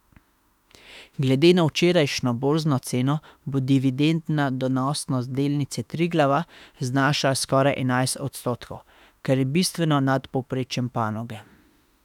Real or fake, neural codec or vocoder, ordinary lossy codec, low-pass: fake; autoencoder, 48 kHz, 32 numbers a frame, DAC-VAE, trained on Japanese speech; none; 19.8 kHz